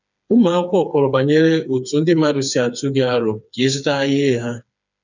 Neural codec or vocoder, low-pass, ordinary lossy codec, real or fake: codec, 16 kHz, 4 kbps, FreqCodec, smaller model; 7.2 kHz; none; fake